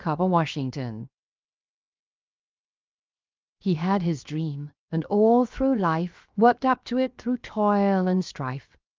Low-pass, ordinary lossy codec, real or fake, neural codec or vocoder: 7.2 kHz; Opus, 32 kbps; fake; codec, 16 kHz, 0.7 kbps, FocalCodec